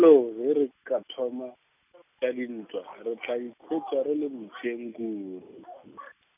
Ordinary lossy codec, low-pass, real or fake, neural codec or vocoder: none; 3.6 kHz; real; none